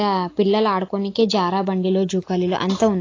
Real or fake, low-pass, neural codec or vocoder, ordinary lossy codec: real; 7.2 kHz; none; AAC, 32 kbps